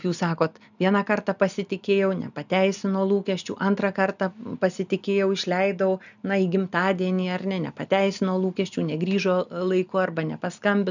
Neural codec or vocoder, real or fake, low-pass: none; real; 7.2 kHz